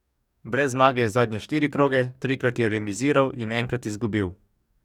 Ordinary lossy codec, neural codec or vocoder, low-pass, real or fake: none; codec, 44.1 kHz, 2.6 kbps, DAC; 19.8 kHz; fake